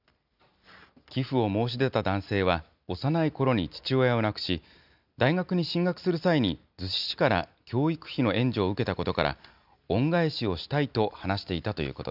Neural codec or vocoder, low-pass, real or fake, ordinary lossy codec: none; 5.4 kHz; real; none